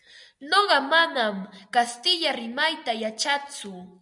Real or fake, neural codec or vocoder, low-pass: real; none; 10.8 kHz